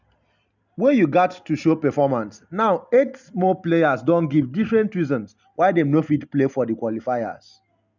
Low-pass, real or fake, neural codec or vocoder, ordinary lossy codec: 7.2 kHz; real; none; none